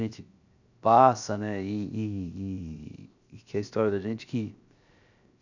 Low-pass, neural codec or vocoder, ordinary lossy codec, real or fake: 7.2 kHz; codec, 16 kHz, 0.7 kbps, FocalCodec; none; fake